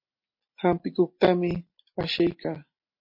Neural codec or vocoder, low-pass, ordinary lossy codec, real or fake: none; 5.4 kHz; MP3, 32 kbps; real